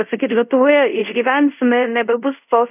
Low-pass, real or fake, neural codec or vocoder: 3.6 kHz; fake; codec, 24 kHz, 0.5 kbps, DualCodec